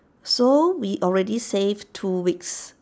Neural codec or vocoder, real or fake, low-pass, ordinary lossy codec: none; real; none; none